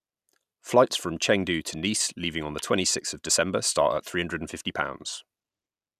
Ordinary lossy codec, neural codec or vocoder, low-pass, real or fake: none; none; 14.4 kHz; real